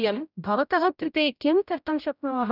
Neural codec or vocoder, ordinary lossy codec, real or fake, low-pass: codec, 16 kHz, 0.5 kbps, X-Codec, HuBERT features, trained on general audio; none; fake; 5.4 kHz